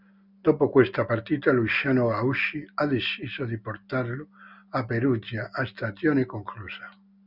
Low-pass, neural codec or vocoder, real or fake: 5.4 kHz; none; real